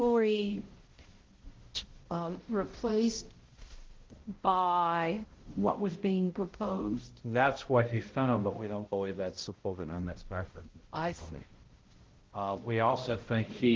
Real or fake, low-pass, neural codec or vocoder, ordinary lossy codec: fake; 7.2 kHz; codec, 16 kHz, 0.5 kbps, X-Codec, HuBERT features, trained on balanced general audio; Opus, 16 kbps